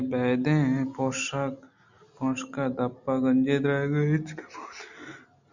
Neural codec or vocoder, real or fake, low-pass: none; real; 7.2 kHz